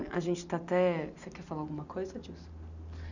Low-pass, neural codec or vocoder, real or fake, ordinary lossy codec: 7.2 kHz; none; real; none